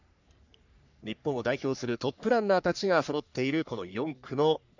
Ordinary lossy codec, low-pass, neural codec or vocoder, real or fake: none; 7.2 kHz; codec, 44.1 kHz, 3.4 kbps, Pupu-Codec; fake